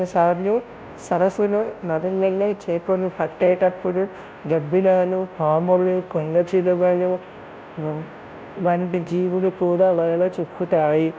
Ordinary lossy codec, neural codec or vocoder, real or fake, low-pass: none; codec, 16 kHz, 0.5 kbps, FunCodec, trained on Chinese and English, 25 frames a second; fake; none